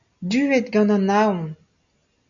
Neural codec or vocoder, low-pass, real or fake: none; 7.2 kHz; real